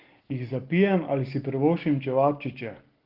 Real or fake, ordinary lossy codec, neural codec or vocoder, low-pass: real; Opus, 16 kbps; none; 5.4 kHz